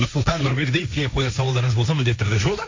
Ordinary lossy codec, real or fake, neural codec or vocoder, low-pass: MP3, 48 kbps; fake; codec, 16 kHz, 1.1 kbps, Voila-Tokenizer; 7.2 kHz